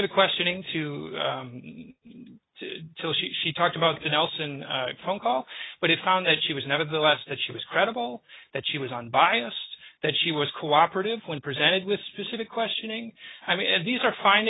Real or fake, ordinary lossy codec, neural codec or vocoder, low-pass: real; AAC, 16 kbps; none; 7.2 kHz